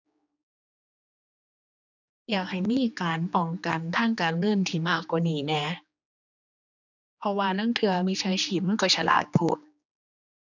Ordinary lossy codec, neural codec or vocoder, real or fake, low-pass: none; codec, 16 kHz, 2 kbps, X-Codec, HuBERT features, trained on general audio; fake; 7.2 kHz